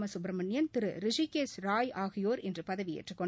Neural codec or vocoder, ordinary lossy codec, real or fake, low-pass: none; none; real; none